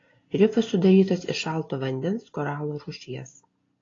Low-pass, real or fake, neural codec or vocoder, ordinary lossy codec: 7.2 kHz; real; none; AAC, 32 kbps